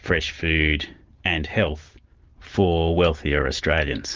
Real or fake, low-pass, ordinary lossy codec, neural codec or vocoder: fake; 7.2 kHz; Opus, 32 kbps; vocoder, 44.1 kHz, 128 mel bands every 512 samples, BigVGAN v2